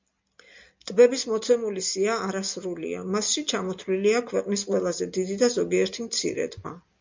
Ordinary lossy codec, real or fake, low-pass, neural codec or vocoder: MP3, 64 kbps; real; 7.2 kHz; none